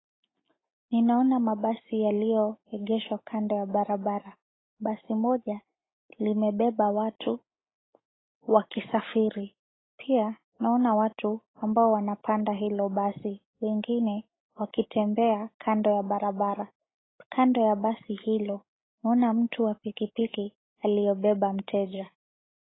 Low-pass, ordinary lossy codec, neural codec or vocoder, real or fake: 7.2 kHz; AAC, 16 kbps; none; real